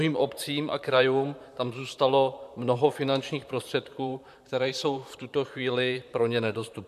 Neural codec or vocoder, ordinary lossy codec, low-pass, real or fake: vocoder, 44.1 kHz, 128 mel bands, Pupu-Vocoder; MP3, 96 kbps; 14.4 kHz; fake